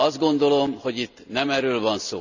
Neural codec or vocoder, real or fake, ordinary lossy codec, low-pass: none; real; none; 7.2 kHz